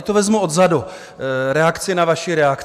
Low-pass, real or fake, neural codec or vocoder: 14.4 kHz; real; none